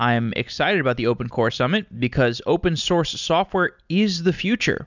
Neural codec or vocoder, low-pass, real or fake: none; 7.2 kHz; real